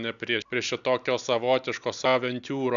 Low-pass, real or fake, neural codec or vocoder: 7.2 kHz; real; none